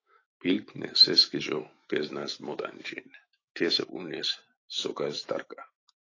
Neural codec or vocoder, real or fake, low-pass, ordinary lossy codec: autoencoder, 48 kHz, 128 numbers a frame, DAC-VAE, trained on Japanese speech; fake; 7.2 kHz; AAC, 32 kbps